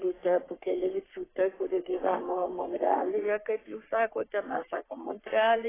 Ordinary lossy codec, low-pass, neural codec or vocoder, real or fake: AAC, 16 kbps; 3.6 kHz; codec, 44.1 kHz, 3.4 kbps, Pupu-Codec; fake